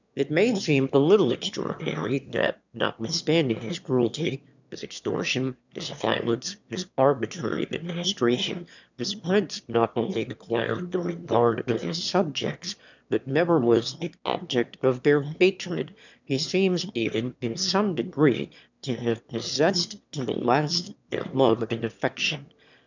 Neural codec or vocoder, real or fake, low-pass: autoencoder, 22.05 kHz, a latent of 192 numbers a frame, VITS, trained on one speaker; fake; 7.2 kHz